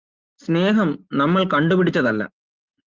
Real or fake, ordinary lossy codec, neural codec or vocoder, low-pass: real; Opus, 32 kbps; none; 7.2 kHz